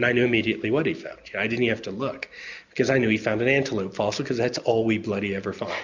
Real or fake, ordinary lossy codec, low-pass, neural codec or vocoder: real; MP3, 64 kbps; 7.2 kHz; none